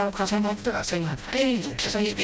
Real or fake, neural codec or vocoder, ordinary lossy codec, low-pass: fake; codec, 16 kHz, 0.5 kbps, FreqCodec, smaller model; none; none